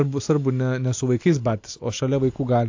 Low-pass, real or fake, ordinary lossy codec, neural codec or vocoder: 7.2 kHz; real; AAC, 48 kbps; none